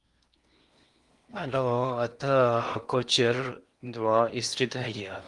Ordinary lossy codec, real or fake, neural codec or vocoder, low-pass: Opus, 32 kbps; fake; codec, 16 kHz in and 24 kHz out, 0.8 kbps, FocalCodec, streaming, 65536 codes; 10.8 kHz